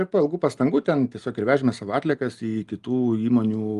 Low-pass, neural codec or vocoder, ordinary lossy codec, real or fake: 10.8 kHz; none; Opus, 32 kbps; real